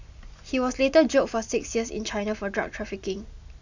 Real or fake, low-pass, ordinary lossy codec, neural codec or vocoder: real; 7.2 kHz; none; none